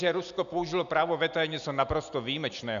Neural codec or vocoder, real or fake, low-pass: none; real; 7.2 kHz